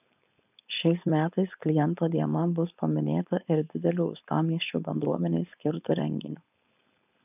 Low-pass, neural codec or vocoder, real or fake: 3.6 kHz; codec, 16 kHz, 4.8 kbps, FACodec; fake